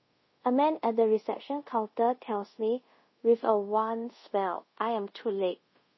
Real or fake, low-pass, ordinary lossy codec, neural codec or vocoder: fake; 7.2 kHz; MP3, 24 kbps; codec, 24 kHz, 0.5 kbps, DualCodec